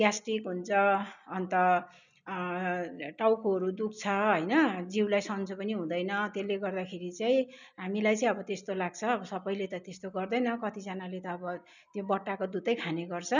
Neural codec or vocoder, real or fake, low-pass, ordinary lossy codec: none; real; 7.2 kHz; none